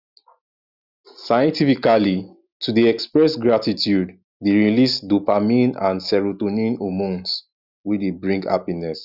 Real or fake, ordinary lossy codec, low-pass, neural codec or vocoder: real; Opus, 64 kbps; 5.4 kHz; none